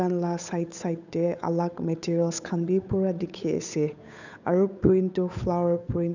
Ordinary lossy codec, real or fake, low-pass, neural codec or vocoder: none; fake; 7.2 kHz; codec, 16 kHz, 8 kbps, FunCodec, trained on Chinese and English, 25 frames a second